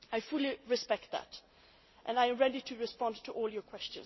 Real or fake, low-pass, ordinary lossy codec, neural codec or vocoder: real; 7.2 kHz; MP3, 24 kbps; none